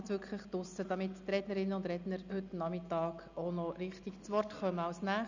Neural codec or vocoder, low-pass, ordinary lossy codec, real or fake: none; 7.2 kHz; none; real